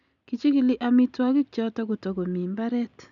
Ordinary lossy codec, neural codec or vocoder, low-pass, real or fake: none; none; 7.2 kHz; real